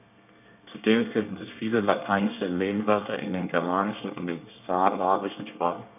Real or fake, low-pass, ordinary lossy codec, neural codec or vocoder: fake; 3.6 kHz; none; codec, 24 kHz, 1 kbps, SNAC